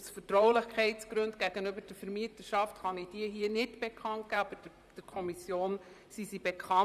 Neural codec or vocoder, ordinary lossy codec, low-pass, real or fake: vocoder, 44.1 kHz, 128 mel bands, Pupu-Vocoder; none; 14.4 kHz; fake